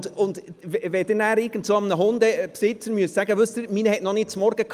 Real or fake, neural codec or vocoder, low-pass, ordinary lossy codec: real; none; 14.4 kHz; Opus, 32 kbps